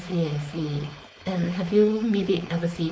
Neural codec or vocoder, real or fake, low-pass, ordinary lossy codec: codec, 16 kHz, 4.8 kbps, FACodec; fake; none; none